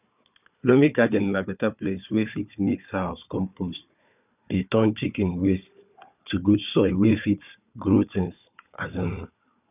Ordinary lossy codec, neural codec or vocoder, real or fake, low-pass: none; codec, 16 kHz, 4 kbps, FunCodec, trained on Chinese and English, 50 frames a second; fake; 3.6 kHz